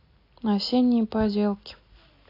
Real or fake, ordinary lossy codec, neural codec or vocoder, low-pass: real; AAC, 32 kbps; none; 5.4 kHz